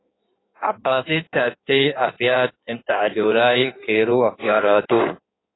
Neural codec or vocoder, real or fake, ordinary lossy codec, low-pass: codec, 16 kHz in and 24 kHz out, 1.1 kbps, FireRedTTS-2 codec; fake; AAC, 16 kbps; 7.2 kHz